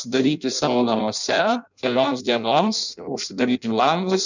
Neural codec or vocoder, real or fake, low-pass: codec, 16 kHz in and 24 kHz out, 0.6 kbps, FireRedTTS-2 codec; fake; 7.2 kHz